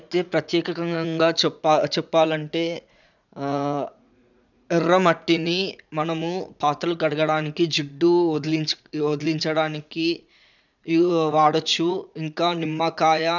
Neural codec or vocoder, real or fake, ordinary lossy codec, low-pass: vocoder, 44.1 kHz, 80 mel bands, Vocos; fake; none; 7.2 kHz